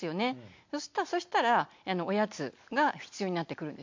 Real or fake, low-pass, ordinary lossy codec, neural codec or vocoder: real; 7.2 kHz; MP3, 48 kbps; none